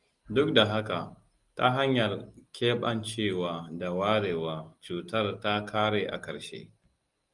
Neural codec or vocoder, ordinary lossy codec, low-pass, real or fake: none; Opus, 32 kbps; 10.8 kHz; real